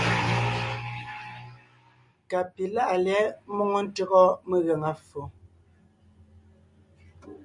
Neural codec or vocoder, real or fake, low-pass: none; real; 10.8 kHz